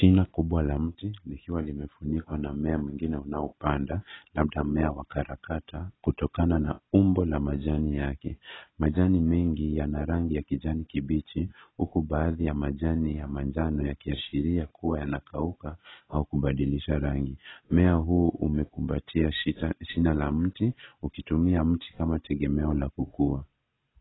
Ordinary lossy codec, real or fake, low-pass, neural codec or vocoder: AAC, 16 kbps; real; 7.2 kHz; none